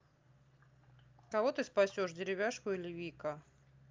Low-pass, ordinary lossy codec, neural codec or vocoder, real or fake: 7.2 kHz; Opus, 24 kbps; none; real